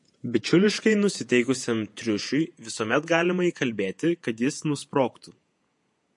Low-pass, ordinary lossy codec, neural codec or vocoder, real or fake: 10.8 kHz; MP3, 48 kbps; vocoder, 48 kHz, 128 mel bands, Vocos; fake